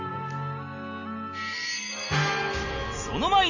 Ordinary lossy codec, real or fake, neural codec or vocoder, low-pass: none; real; none; 7.2 kHz